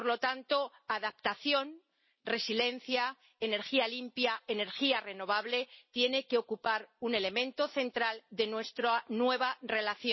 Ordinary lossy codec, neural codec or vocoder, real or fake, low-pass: MP3, 24 kbps; none; real; 7.2 kHz